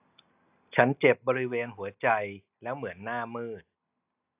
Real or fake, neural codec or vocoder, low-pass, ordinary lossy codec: real; none; 3.6 kHz; AAC, 32 kbps